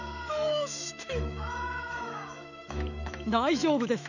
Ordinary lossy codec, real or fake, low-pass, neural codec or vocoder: none; fake; 7.2 kHz; autoencoder, 48 kHz, 128 numbers a frame, DAC-VAE, trained on Japanese speech